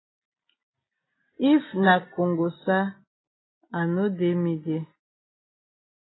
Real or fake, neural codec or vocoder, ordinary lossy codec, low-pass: real; none; AAC, 16 kbps; 7.2 kHz